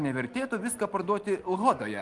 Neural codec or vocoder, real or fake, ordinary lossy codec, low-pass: none; real; Opus, 24 kbps; 10.8 kHz